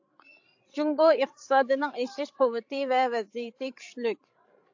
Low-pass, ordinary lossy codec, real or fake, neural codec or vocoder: 7.2 kHz; AAC, 48 kbps; fake; codec, 16 kHz, 16 kbps, FreqCodec, larger model